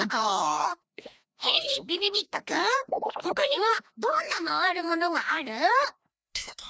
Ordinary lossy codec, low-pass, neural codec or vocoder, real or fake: none; none; codec, 16 kHz, 1 kbps, FreqCodec, larger model; fake